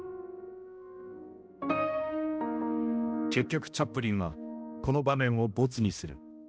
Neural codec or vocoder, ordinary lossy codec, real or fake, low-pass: codec, 16 kHz, 1 kbps, X-Codec, HuBERT features, trained on balanced general audio; none; fake; none